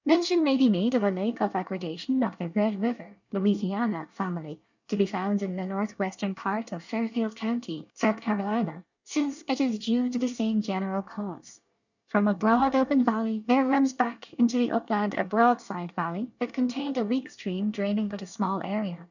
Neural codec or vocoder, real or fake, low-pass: codec, 24 kHz, 1 kbps, SNAC; fake; 7.2 kHz